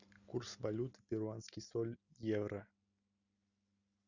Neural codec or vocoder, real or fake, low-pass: none; real; 7.2 kHz